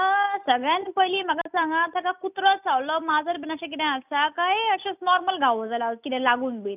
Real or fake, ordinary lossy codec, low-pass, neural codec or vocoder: real; none; 3.6 kHz; none